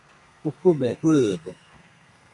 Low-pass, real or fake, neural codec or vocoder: 10.8 kHz; fake; codec, 32 kHz, 1.9 kbps, SNAC